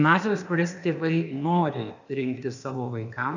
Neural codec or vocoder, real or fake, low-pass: codec, 16 kHz, 0.8 kbps, ZipCodec; fake; 7.2 kHz